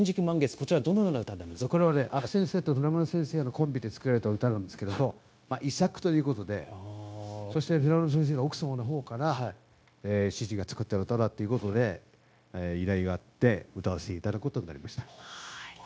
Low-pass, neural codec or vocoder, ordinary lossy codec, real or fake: none; codec, 16 kHz, 0.9 kbps, LongCat-Audio-Codec; none; fake